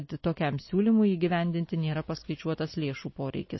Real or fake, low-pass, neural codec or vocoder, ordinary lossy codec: real; 7.2 kHz; none; MP3, 24 kbps